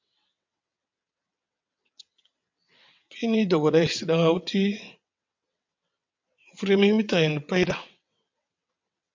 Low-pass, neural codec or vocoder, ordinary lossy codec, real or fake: 7.2 kHz; vocoder, 22.05 kHz, 80 mel bands, WaveNeXt; MP3, 64 kbps; fake